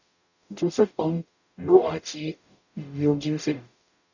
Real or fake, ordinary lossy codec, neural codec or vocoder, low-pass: fake; none; codec, 44.1 kHz, 0.9 kbps, DAC; 7.2 kHz